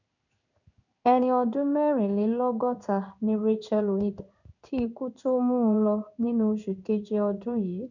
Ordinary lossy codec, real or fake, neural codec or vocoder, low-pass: none; fake; codec, 16 kHz in and 24 kHz out, 1 kbps, XY-Tokenizer; 7.2 kHz